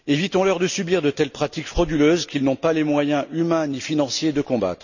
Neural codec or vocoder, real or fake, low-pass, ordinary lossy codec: none; real; 7.2 kHz; none